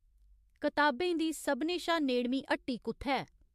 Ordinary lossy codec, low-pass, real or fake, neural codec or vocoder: MP3, 64 kbps; 14.4 kHz; fake; autoencoder, 48 kHz, 128 numbers a frame, DAC-VAE, trained on Japanese speech